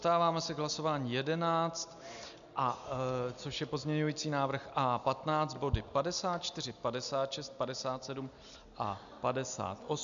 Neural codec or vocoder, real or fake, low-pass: none; real; 7.2 kHz